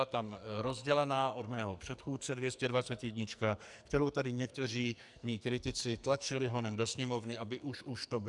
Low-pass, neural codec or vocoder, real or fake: 10.8 kHz; codec, 44.1 kHz, 2.6 kbps, SNAC; fake